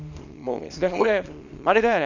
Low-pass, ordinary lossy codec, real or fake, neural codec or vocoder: 7.2 kHz; none; fake; codec, 24 kHz, 0.9 kbps, WavTokenizer, small release